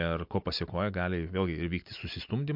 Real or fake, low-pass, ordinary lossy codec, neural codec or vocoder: real; 5.4 kHz; MP3, 48 kbps; none